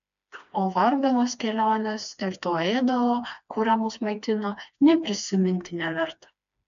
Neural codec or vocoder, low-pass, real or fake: codec, 16 kHz, 2 kbps, FreqCodec, smaller model; 7.2 kHz; fake